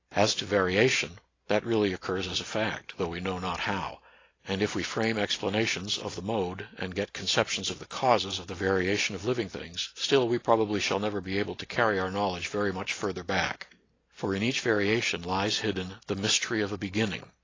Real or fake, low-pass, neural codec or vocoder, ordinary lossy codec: real; 7.2 kHz; none; AAC, 32 kbps